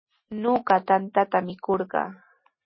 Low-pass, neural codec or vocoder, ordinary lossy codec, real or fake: 7.2 kHz; none; MP3, 24 kbps; real